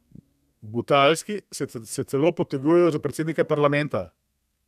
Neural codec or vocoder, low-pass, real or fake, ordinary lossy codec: codec, 32 kHz, 1.9 kbps, SNAC; 14.4 kHz; fake; none